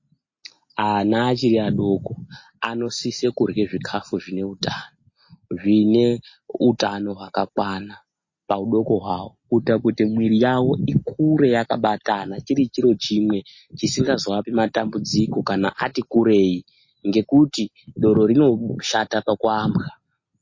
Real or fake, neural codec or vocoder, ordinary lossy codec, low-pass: real; none; MP3, 32 kbps; 7.2 kHz